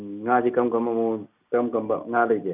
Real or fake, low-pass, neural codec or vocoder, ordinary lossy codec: real; 3.6 kHz; none; none